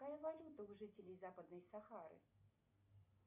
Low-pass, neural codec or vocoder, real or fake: 3.6 kHz; none; real